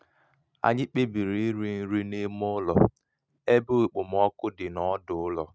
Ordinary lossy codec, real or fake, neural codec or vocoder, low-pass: none; real; none; none